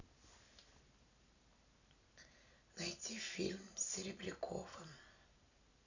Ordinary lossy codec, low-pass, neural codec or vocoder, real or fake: none; 7.2 kHz; none; real